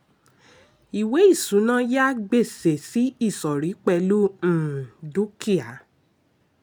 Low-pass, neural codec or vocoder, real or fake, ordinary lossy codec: none; none; real; none